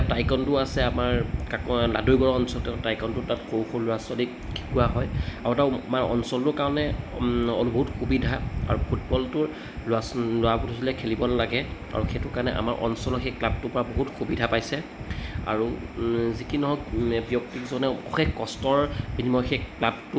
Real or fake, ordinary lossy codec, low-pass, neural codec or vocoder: real; none; none; none